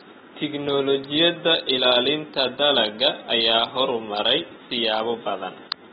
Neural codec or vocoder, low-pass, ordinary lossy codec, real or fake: none; 7.2 kHz; AAC, 16 kbps; real